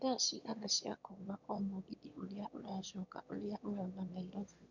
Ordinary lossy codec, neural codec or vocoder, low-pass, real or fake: none; autoencoder, 22.05 kHz, a latent of 192 numbers a frame, VITS, trained on one speaker; 7.2 kHz; fake